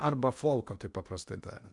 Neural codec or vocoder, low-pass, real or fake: codec, 16 kHz in and 24 kHz out, 0.8 kbps, FocalCodec, streaming, 65536 codes; 10.8 kHz; fake